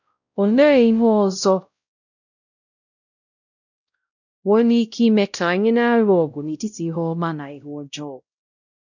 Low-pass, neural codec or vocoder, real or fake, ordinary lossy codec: 7.2 kHz; codec, 16 kHz, 0.5 kbps, X-Codec, WavLM features, trained on Multilingual LibriSpeech; fake; none